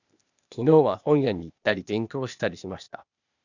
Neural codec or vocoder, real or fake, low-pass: codec, 16 kHz, 0.8 kbps, ZipCodec; fake; 7.2 kHz